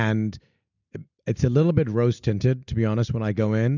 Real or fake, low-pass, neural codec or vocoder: real; 7.2 kHz; none